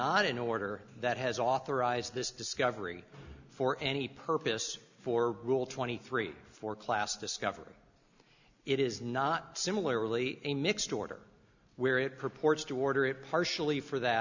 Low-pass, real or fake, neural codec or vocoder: 7.2 kHz; real; none